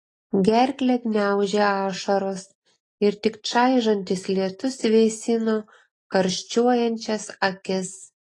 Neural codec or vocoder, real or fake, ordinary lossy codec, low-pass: none; real; AAC, 32 kbps; 10.8 kHz